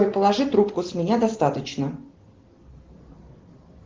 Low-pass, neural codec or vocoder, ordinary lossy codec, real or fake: 7.2 kHz; none; Opus, 16 kbps; real